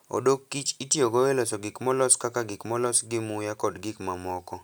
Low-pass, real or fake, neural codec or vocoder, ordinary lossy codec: none; real; none; none